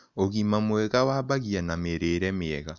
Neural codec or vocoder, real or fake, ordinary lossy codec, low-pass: none; real; none; 7.2 kHz